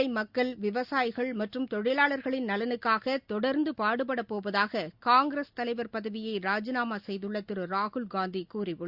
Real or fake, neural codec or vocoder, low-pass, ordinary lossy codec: real; none; 5.4 kHz; Opus, 64 kbps